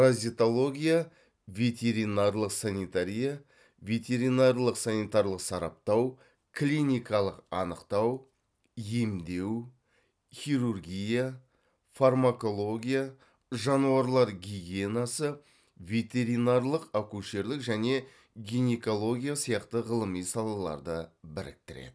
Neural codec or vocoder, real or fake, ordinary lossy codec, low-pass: none; real; none; none